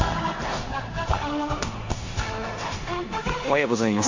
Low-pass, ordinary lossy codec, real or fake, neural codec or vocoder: 7.2 kHz; MP3, 48 kbps; fake; codec, 16 kHz in and 24 kHz out, 0.9 kbps, LongCat-Audio-Codec, four codebook decoder